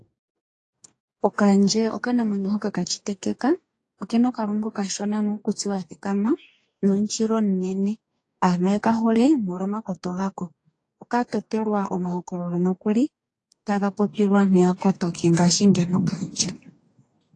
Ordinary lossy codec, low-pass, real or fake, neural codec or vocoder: AAC, 48 kbps; 10.8 kHz; fake; codec, 44.1 kHz, 2.6 kbps, DAC